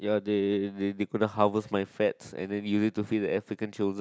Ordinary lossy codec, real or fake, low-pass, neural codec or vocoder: none; real; none; none